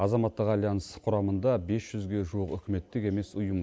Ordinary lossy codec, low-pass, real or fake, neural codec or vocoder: none; none; real; none